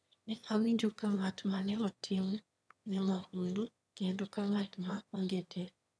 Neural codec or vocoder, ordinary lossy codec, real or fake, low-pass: autoencoder, 22.05 kHz, a latent of 192 numbers a frame, VITS, trained on one speaker; none; fake; none